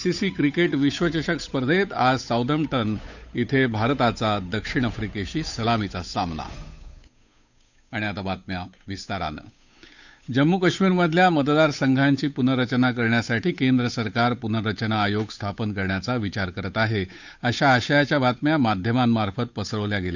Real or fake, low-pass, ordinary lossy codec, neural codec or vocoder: fake; 7.2 kHz; none; codec, 16 kHz, 8 kbps, FunCodec, trained on Chinese and English, 25 frames a second